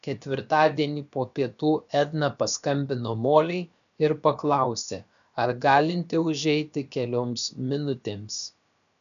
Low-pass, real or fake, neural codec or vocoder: 7.2 kHz; fake; codec, 16 kHz, about 1 kbps, DyCAST, with the encoder's durations